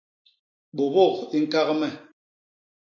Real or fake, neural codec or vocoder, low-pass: real; none; 7.2 kHz